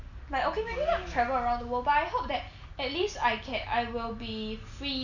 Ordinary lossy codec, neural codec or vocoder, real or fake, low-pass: none; none; real; 7.2 kHz